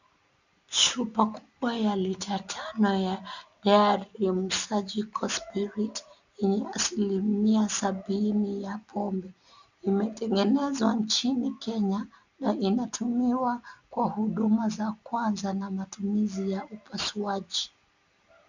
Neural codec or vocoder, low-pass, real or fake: none; 7.2 kHz; real